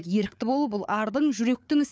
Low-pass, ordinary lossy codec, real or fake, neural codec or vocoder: none; none; fake; codec, 16 kHz, 4 kbps, FunCodec, trained on Chinese and English, 50 frames a second